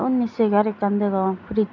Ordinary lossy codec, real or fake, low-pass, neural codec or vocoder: none; real; 7.2 kHz; none